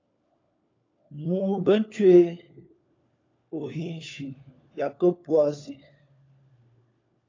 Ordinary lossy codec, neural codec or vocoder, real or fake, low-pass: AAC, 32 kbps; codec, 16 kHz, 4 kbps, FunCodec, trained on LibriTTS, 50 frames a second; fake; 7.2 kHz